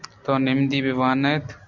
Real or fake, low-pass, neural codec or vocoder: real; 7.2 kHz; none